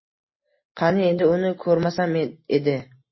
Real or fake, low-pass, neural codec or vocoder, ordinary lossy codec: real; 7.2 kHz; none; MP3, 24 kbps